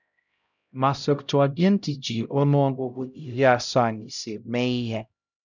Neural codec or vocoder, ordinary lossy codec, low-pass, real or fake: codec, 16 kHz, 0.5 kbps, X-Codec, HuBERT features, trained on LibriSpeech; none; 7.2 kHz; fake